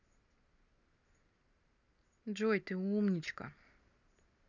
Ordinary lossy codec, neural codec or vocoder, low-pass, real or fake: none; none; 7.2 kHz; real